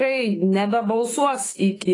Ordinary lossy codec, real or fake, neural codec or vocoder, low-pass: AAC, 32 kbps; fake; autoencoder, 48 kHz, 32 numbers a frame, DAC-VAE, trained on Japanese speech; 10.8 kHz